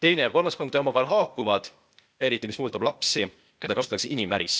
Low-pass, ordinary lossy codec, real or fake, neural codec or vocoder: none; none; fake; codec, 16 kHz, 0.8 kbps, ZipCodec